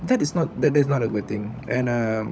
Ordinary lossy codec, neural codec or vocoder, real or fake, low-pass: none; codec, 16 kHz, 16 kbps, FunCodec, trained on LibriTTS, 50 frames a second; fake; none